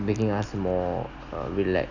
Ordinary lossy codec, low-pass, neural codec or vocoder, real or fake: none; 7.2 kHz; none; real